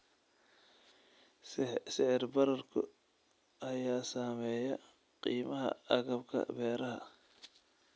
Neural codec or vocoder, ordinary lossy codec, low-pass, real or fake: none; none; none; real